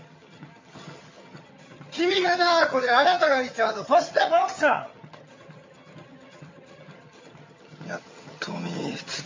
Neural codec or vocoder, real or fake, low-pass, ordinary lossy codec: vocoder, 22.05 kHz, 80 mel bands, HiFi-GAN; fake; 7.2 kHz; MP3, 32 kbps